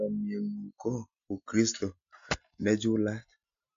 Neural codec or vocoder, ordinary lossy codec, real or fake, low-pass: none; MP3, 48 kbps; real; 7.2 kHz